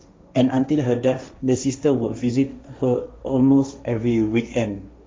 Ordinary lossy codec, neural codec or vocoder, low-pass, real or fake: none; codec, 16 kHz, 1.1 kbps, Voila-Tokenizer; none; fake